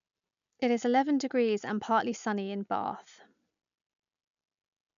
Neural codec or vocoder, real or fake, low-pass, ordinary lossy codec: none; real; 7.2 kHz; none